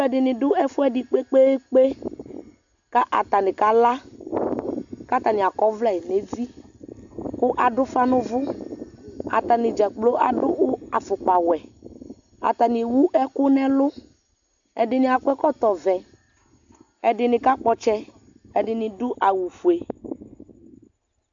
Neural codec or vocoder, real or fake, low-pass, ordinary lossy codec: none; real; 7.2 kHz; AAC, 64 kbps